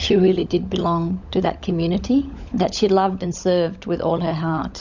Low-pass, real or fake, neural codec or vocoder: 7.2 kHz; fake; codec, 16 kHz, 16 kbps, FunCodec, trained on LibriTTS, 50 frames a second